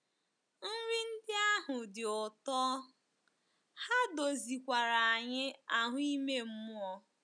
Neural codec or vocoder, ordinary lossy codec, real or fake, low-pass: none; none; real; 9.9 kHz